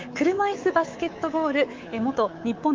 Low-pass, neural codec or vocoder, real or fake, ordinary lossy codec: 7.2 kHz; codec, 24 kHz, 3.1 kbps, DualCodec; fake; Opus, 32 kbps